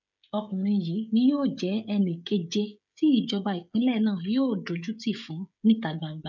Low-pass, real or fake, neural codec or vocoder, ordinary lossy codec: 7.2 kHz; fake; codec, 16 kHz, 16 kbps, FreqCodec, smaller model; none